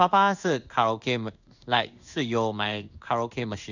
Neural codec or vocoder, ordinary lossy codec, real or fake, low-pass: codec, 16 kHz in and 24 kHz out, 1 kbps, XY-Tokenizer; none; fake; 7.2 kHz